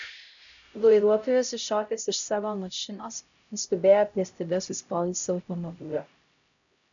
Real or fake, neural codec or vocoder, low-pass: fake; codec, 16 kHz, 0.5 kbps, X-Codec, HuBERT features, trained on LibriSpeech; 7.2 kHz